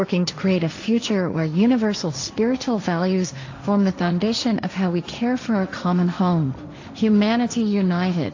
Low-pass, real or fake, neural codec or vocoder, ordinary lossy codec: 7.2 kHz; fake; codec, 16 kHz, 1.1 kbps, Voila-Tokenizer; AAC, 48 kbps